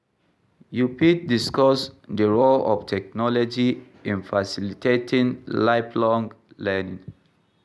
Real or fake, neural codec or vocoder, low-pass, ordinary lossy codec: real; none; none; none